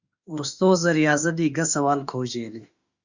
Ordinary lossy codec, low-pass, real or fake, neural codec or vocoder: Opus, 64 kbps; 7.2 kHz; fake; autoencoder, 48 kHz, 32 numbers a frame, DAC-VAE, trained on Japanese speech